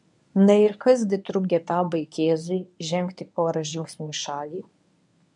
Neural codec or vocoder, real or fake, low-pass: codec, 24 kHz, 0.9 kbps, WavTokenizer, medium speech release version 1; fake; 10.8 kHz